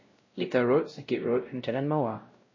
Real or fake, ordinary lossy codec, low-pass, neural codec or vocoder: fake; MP3, 32 kbps; 7.2 kHz; codec, 16 kHz, 0.5 kbps, X-Codec, WavLM features, trained on Multilingual LibriSpeech